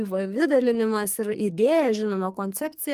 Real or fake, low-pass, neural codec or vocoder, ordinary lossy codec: fake; 14.4 kHz; codec, 44.1 kHz, 2.6 kbps, SNAC; Opus, 32 kbps